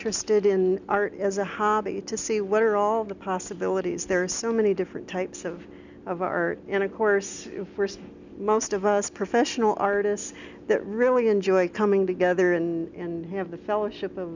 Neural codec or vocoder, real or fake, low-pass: none; real; 7.2 kHz